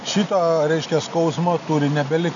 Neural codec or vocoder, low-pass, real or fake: none; 7.2 kHz; real